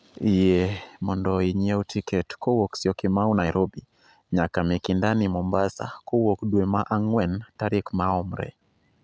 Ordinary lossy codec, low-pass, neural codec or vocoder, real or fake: none; none; none; real